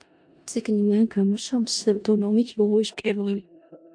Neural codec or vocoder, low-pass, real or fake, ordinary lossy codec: codec, 16 kHz in and 24 kHz out, 0.4 kbps, LongCat-Audio-Codec, four codebook decoder; 9.9 kHz; fake; AAC, 64 kbps